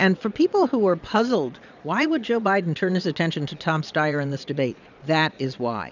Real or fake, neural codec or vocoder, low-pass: fake; vocoder, 22.05 kHz, 80 mel bands, Vocos; 7.2 kHz